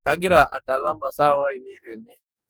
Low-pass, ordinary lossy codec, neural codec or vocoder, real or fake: none; none; codec, 44.1 kHz, 2.6 kbps, DAC; fake